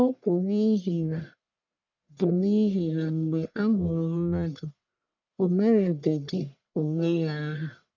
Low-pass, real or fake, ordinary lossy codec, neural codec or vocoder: 7.2 kHz; fake; none; codec, 44.1 kHz, 1.7 kbps, Pupu-Codec